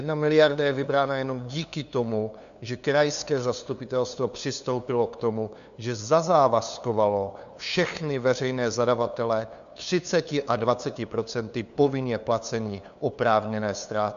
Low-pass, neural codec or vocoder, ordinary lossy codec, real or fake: 7.2 kHz; codec, 16 kHz, 2 kbps, FunCodec, trained on LibriTTS, 25 frames a second; MP3, 96 kbps; fake